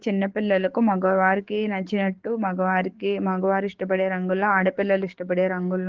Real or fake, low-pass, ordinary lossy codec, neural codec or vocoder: fake; 7.2 kHz; Opus, 16 kbps; codec, 44.1 kHz, 7.8 kbps, DAC